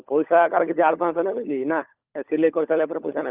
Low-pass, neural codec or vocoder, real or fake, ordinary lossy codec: 3.6 kHz; codec, 16 kHz, 16 kbps, FunCodec, trained on LibriTTS, 50 frames a second; fake; Opus, 24 kbps